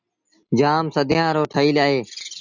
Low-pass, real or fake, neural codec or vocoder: 7.2 kHz; real; none